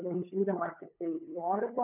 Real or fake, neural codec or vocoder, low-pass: fake; codec, 16 kHz, 8 kbps, FunCodec, trained on LibriTTS, 25 frames a second; 3.6 kHz